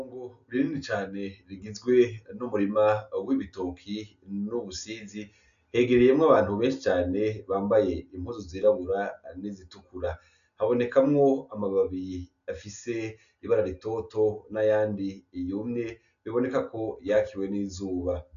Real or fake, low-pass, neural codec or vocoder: real; 7.2 kHz; none